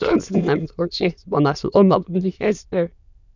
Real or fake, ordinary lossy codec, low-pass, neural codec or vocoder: fake; none; 7.2 kHz; autoencoder, 22.05 kHz, a latent of 192 numbers a frame, VITS, trained on many speakers